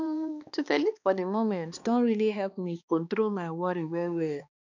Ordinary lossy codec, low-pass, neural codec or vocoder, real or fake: none; 7.2 kHz; codec, 16 kHz, 2 kbps, X-Codec, HuBERT features, trained on balanced general audio; fake